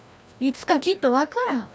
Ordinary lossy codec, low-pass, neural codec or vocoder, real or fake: none; none; codec, 16 kHz, 1 kbps, FreqCodec, larger model; fake